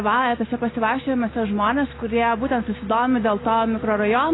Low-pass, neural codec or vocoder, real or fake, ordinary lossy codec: 7.2 kHz; none; real; AAC, 16 kbps